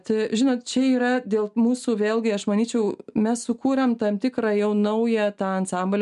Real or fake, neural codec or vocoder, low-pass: real; none; 10.8 kHz